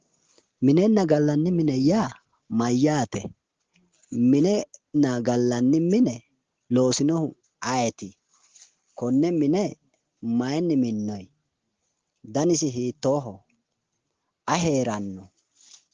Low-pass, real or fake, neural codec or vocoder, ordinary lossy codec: 7.2 kHz; real; none; Opus, 16 kbps